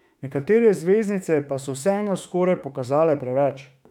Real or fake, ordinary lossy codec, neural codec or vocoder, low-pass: fake; none; autoencoder, 48 kHz, 32 numbers a frame, DAC-VAE, trained on Japanese speech; 19.8 kHz